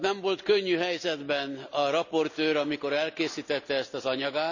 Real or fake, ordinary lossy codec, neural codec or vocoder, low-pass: real; none; none; 7.2 kHz